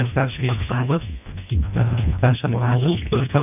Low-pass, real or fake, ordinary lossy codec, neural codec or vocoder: 3.6 kHz; fake; none; codec, 24 kHz, 1.5 kbps, HILCodec